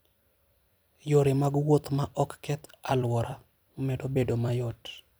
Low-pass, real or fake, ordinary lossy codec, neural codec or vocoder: none; fake; none; vocoder, 44.1 kHz, 128 mel bands every 256 samples, BigVGAN v2